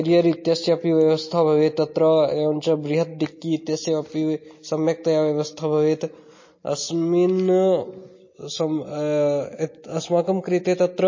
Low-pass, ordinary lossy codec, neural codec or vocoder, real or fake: 7.2 kHz; MP3, 32 kbps; none; real